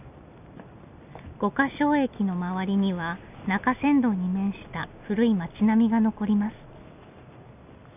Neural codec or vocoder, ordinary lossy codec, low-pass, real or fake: none; none; 3.6 kHz; real